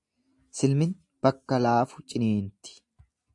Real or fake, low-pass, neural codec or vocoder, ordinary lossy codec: real; 10.8 kHz; none; AAC, 64 kbps